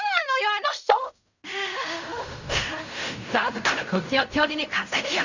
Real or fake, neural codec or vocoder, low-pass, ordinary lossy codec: fake; codec, 16 kHz in and 24 kHz out, 0.4 kbps, LongCat-Audio-Codec, fine tuned four codebook decoder; 7.2 kHz; none